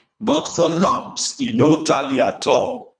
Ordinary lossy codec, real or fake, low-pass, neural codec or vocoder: none; fake; 9.9 kHz; codec, 24 kHz, 1.5 kbps, HILCodec